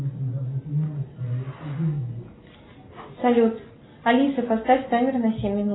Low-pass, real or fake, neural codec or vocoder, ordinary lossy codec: 7.2 kHz; real; none; AAC, 16 kbps